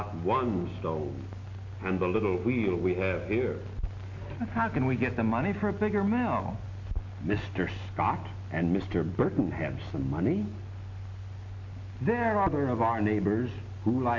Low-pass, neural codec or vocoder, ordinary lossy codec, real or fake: 7.2 kHz; none; AAC, 48 kbps; real